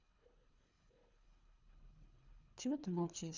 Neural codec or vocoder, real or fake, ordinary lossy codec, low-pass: codec, 24 kHz, 3 kbps, HILCodec; fake; Opus, 64 kbps; 7.2 kHz